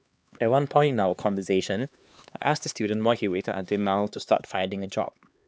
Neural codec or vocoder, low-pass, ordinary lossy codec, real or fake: codec, 16 kHz, 2 kbps, X-Codec, HuBERT features, trained on LibriSpeech; none; none; fake